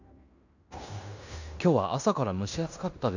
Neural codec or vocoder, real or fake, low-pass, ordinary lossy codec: codec, 16 kHz in and 24 kHz out, 0.9 kbps, LongCat-Audio-Codec, fine tuned four codebook decoder; fake; 7.2 kHz; none